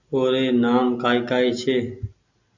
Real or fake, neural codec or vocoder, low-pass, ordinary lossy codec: real; none; 7.2 kHz; Opus, 64 kbps